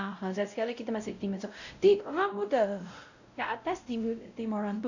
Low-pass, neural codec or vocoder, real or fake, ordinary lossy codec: 7.2 kHz; codec, 16 kHz, 0.5 kbps, X-Codec, WavLM features, trained on Multilingual LibriSpeech; fake; none